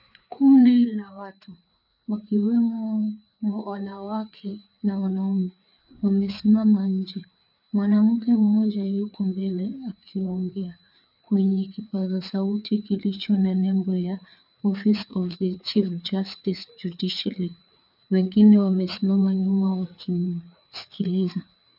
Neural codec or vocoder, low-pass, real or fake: codec, 16 kHz, 4 kbps, FreqCodec, larger model; 5.4 kHz; fake